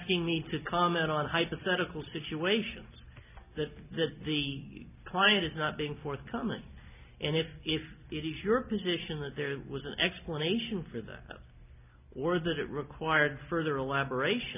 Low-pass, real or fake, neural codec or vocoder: 3.6 kHz; real; none